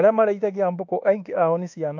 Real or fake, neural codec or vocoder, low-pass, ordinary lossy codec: fake; codec, 24 kHz, 1.2 kbps, DualCodec; 7.2 kHz; AAC, 48 kbps